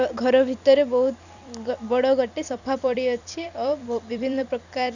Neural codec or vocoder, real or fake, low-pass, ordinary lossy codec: vocoder, 44.1 kHz, 128 mel bands every 256 samples, BigVGAN v2; fake; 7.2 kHz; none